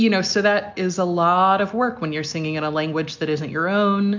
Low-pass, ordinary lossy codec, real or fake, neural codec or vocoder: 7.2 kHz; MP3, 64 kbps; real; none